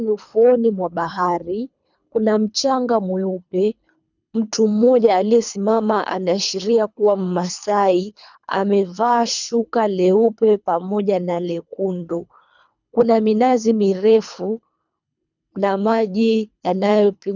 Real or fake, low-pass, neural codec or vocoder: fake; 7.2 kHz; codec, 24 kHz, 3 kbps, HILCodec